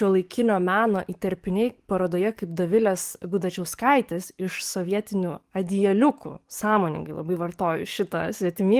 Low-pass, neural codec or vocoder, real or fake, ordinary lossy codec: 14.4 kHz; codec, 44.1 kHz, 7.8 kbps, DAC; fake; Opus, 24 kbps